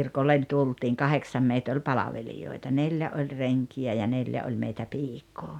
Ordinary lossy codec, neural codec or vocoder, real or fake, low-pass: none; none; real; 19.8 kHz